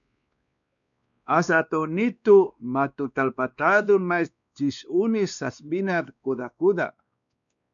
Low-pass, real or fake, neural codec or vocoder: 7.2 kHz; fake; codec, 16 kHz, 2 kbps, X-Codec, WavLM features, trained on Multilingual LibriSpeech